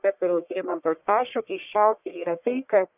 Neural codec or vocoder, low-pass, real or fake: codec, 44.1 kHz, 1.7 kbps, Pupu-Codec; 3.6 kHz; fake